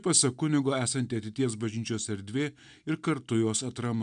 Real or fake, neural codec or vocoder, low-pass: real; none; 9.9 kHz